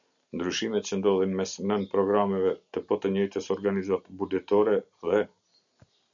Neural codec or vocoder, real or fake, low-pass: none; real; 7.2 kHz